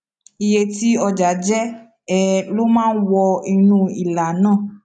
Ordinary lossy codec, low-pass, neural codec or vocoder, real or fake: none; 9.9 kHz; none; real